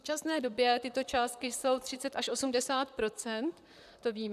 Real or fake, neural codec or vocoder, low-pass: fake; vocoder, 44.1 kHz, 128 mel bands, Pupu-Vocoder; 14.4 kHz